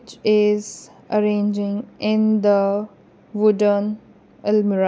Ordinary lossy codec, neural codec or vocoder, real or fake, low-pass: none; none; real; none